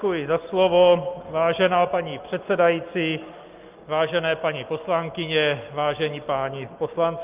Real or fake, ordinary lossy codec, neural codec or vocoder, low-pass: real; Opus, 32 kbps; none; 3.6 kHz